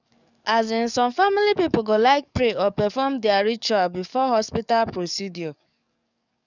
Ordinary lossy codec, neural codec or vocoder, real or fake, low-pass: none; codec, 44.1 kHz, 7.8 kbps, DAC; fake; 7.2 kHz